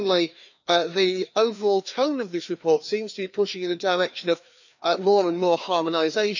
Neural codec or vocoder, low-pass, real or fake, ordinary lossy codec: codec, 16 kHz, 2 kbps, FreqCodec, larger model; 7.2 kHz; fake; none